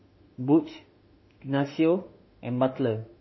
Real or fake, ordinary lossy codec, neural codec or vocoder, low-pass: fake; MP3, 24 kbps; autoencoder, 48 kHz, 32 numbers a frame, DAC-VAE, trained on Japanese speech; 7.2 kHz